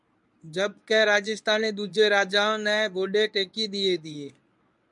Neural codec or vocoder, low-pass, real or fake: codec, 24 kHz, 0.9 kbps, WavTokenizer, medium speech release version 1; 10.8 kHz; fake